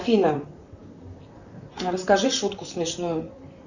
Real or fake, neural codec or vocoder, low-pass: fake; vocoder, 44.1 kHz, 128 mel bands, Pupu-Vocoder; 7.2 kHz